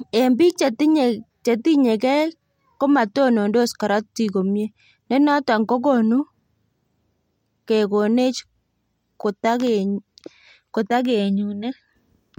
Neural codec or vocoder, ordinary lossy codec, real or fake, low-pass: none; MP3, 64 kbps; real; 19.8 kHz